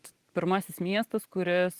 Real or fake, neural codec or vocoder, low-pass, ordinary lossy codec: real; none; 14.4 kHz; Opus, 24 kbps